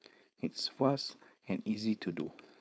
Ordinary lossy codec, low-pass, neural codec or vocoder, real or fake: none; none; codec, 16 kHz, 4.8 kbps, FACodec; fake